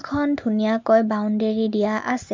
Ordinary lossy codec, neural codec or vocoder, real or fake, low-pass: MP3, 64 kbps; none; real; 7.2 kHz